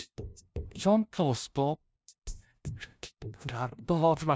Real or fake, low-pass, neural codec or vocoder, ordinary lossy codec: fake; none; codec, 16 kHz, 0.5 kbps, FunCodec, trained on LibriTTS, 25 frames a second; none